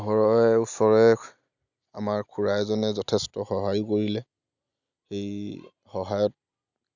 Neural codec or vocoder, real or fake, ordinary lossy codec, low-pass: none; real; none; 7.2 kHz